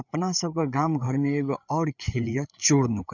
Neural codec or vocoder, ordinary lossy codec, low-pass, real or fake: codec, 16 kHz, 8 kbps, FreqCodec, larger model; none; 7.2 kHz; fake